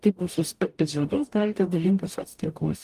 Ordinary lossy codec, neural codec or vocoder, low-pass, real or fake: Opus, 32 kbps; codec, 44.1 kHz, 0.9 kbps, DAC; 14.4 kHz; fake